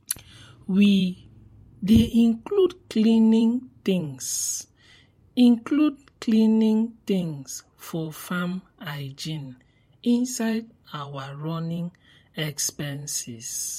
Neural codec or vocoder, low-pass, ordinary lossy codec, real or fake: vocoder, 44.1 kHz, 128 mel bands every 512 samples, BigVGAN v2; 19.8 kHz; MP3, 64 kbps; fake